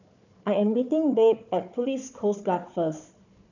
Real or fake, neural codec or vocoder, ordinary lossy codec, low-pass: fake; codec, 16 kHz, 4 kbps, FunCodec, trained on Chinese and English, 50 frames a second; none; 7.2 kHz